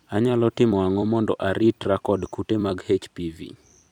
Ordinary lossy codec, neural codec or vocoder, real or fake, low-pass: none; vocoder, 44.1 kHz, 128 mel bands every 256 samples, BigVGAN v2; fake; 19.8 kHz